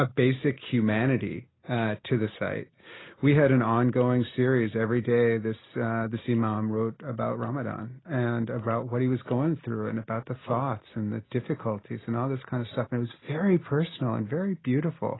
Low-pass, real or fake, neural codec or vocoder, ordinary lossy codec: 7.2 kHz; real; none; AAC, 16 kbps